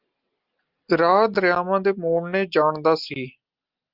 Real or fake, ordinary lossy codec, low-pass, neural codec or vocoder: real; Opus, 24 kbps; 5.4 kHz; none